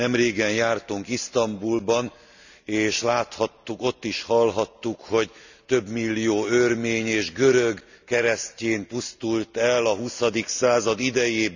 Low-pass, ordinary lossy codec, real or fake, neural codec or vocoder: 7.2 kHz; none; real; none